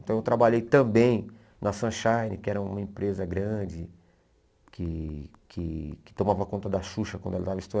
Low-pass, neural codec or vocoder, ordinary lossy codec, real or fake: none; none; none; real